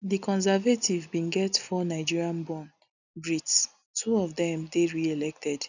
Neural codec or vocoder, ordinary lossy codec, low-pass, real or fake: none; none; 7.2 kHz; real